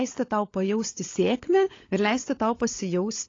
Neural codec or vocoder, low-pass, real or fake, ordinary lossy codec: codec, 16 kHz, 16 kbps, FunCodec, trained on LibriTTS, 50 frames a second; 7.2 kHz; fake; AAC, 32 kbps